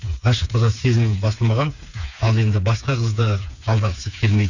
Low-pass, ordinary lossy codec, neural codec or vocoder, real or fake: 7.2 kHz; none; codec, 16 kHz, 4 kbps, FreqCodec, smaller model; fake